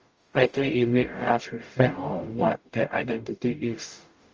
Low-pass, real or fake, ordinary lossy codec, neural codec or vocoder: 7.2 kHz; fake; Opus, 24 kbps; codec, 44.1 kHz, 0.9 kbps, DAC